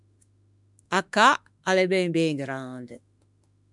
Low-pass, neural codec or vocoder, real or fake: 10.8 kHz; autoencoder, 48 kHz, 32 numbers a frame, DAC-VAE, trained on Japanese speech; fake